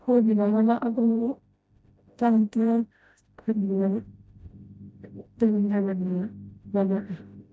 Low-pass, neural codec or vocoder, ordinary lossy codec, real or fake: none; codec, 16 kHz, 0.5 kbps, FreqCodec, smaller model; none; fake